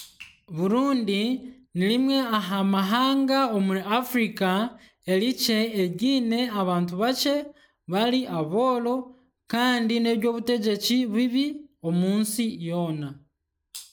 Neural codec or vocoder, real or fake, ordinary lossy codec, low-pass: none; real; none; none